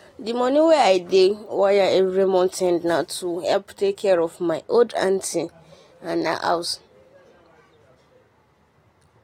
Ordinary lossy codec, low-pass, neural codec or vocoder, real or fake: AAC, 48 kbps; 19.8 kHz; none; real